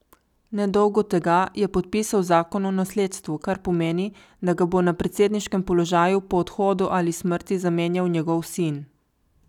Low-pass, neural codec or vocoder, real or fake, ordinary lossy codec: 19.8 kHz; none; real; none